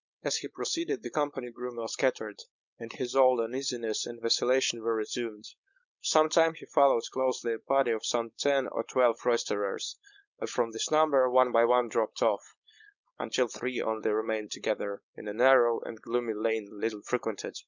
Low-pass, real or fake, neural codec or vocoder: 7.2 kHz; fake; codec, 16 kHz, 4.8 kbps, FACodec